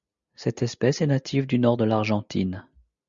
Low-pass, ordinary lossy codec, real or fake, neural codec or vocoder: 7.2 kHz; Opus, 64 kbps; real; none